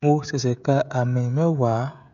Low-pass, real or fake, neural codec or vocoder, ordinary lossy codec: 7.2 kHz; fake; codec, 16 kHz, 16 kbps, FreqCodec, smaller model; none